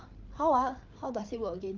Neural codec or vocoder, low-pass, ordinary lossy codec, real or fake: codec, 16 kHz, 16 kbps, FreqCodec, larger model; 7.2 kHz; Opus, 24 kbps; fake